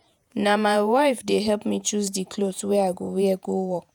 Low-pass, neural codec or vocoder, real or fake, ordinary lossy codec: none; vocoder, 48 kHz, 128 mel bands, Vocos; fake; none